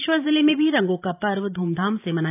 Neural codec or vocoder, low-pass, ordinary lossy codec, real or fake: vocoder, 44.1 kHz, 128 mel bands every 512 samples, BigVGAN v2; 3.6 kHz; none; fake